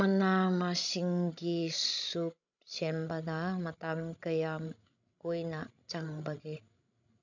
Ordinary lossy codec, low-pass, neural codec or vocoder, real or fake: none; 7.2 kHz; codec, 16 kHz, 16 kbps, FreqCodec, larger model; fake